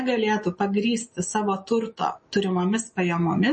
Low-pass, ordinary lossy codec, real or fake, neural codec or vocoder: 10.8 kHz; MP3, 32 kbps; real; none